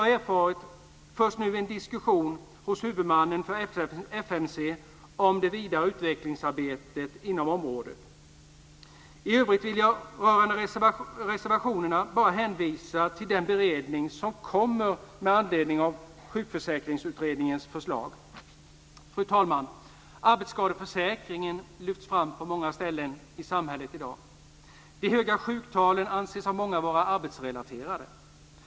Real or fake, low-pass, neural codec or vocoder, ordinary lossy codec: real; none; none; none